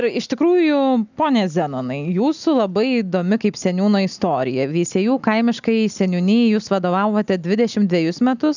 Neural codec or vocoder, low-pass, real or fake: none; 7.2 kHz; real